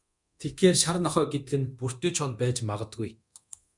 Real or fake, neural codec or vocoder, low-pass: fake; codec, 24 kHz, 0.9 kbps, DualCodec; 10.8 kHz